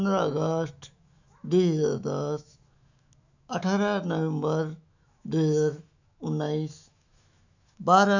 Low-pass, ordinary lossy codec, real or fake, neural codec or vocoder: 7.2 kHz; none; fake; autoencoder, 48 kHz, 128 numbers a frame, DAC-VAE, trained on Japanese speech